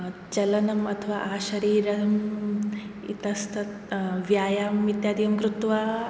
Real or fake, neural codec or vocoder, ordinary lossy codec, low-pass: real; none; none; none